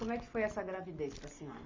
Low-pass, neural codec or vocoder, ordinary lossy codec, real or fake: 7.2 kHz; none; MP3, 48 kbps; real